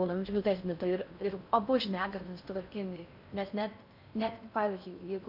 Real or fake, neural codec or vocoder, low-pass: fake; codec, 16 kHz in and 24 kHz out, 0.6 kbps, FocalCodec, streaming, 4096 codes; 5.4 kHz